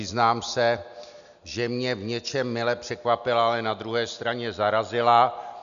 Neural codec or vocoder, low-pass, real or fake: none; 7.2 kHz; real